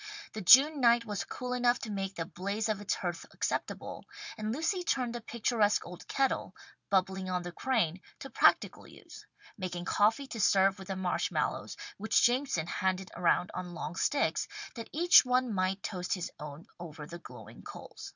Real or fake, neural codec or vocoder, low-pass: real; none; 7.2 kHz